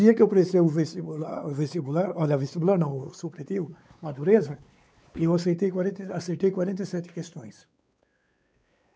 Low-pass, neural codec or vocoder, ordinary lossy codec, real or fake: none; codec, 16 kHz, 4 kbps, X-Codec, WavLM features, trained on Multilingual LibriSpeech; none; fake